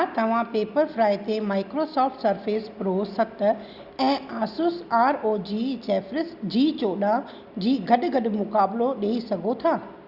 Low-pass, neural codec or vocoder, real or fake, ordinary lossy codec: 5.4 kHz; none; real; Opus, 64 kbps